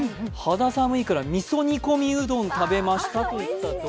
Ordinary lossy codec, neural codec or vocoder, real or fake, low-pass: none; none; real; none